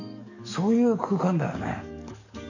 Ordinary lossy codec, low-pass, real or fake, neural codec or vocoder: none; 7.2 kHz; fake; codec, 44.1 kHz, 7.8 kbps, DAC